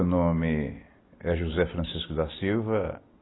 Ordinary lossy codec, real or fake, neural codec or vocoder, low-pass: AAC, 16 kbps; real; none; 7.2 kHz